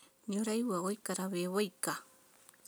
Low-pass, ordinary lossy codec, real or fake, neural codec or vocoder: none; none; real; none